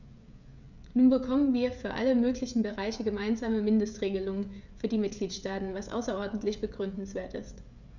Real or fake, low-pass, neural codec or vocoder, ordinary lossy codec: fake; 7.2 kHz; codec, 16 kHz, 16 kbps, FreqCodec, smaller model; none